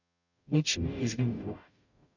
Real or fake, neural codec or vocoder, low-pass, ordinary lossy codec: fake; codec, 44.1 kHz, 0.9 kbps, DAC; 7.2 kHz; none